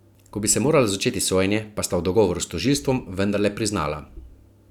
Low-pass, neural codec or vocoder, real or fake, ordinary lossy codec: 19.8 kHz; none; real; none